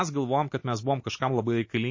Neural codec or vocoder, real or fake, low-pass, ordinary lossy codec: none; real; 7.2 kHz; MP3, 32 kbps